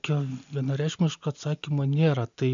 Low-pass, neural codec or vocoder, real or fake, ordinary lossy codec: 7.2 kHz; none; real; MP3, 96 kbps